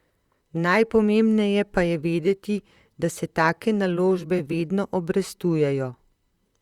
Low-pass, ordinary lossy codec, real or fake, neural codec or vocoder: 19.8 kHz; Opus, 64 kbps; fake; vocoder, 44.1 kHz, 128 mel bands, Pupu-Vocoder